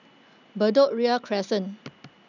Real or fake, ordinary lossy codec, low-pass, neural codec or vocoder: real; none; 7.2 kHz; none